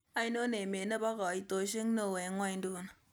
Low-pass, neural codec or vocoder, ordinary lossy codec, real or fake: none; none; none; real